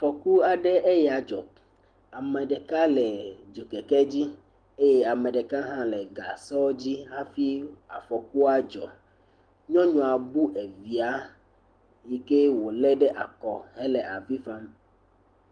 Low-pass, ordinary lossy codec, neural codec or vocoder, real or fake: 9.9 kHz; Opus, 24 kbps; none; real